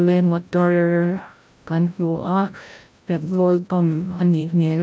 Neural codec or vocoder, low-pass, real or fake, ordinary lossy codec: codec, 16 kHz, 0.5 kbps, FreqCodec, larger model; none; fake; none